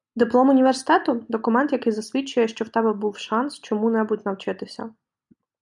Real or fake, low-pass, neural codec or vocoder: real; 10.8 kHz; none